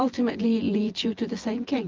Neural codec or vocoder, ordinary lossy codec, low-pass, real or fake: vocoder, 24 kHz, 100 mel bands, Vocos; Opus, 32 kbps; 7.2 kHz; fake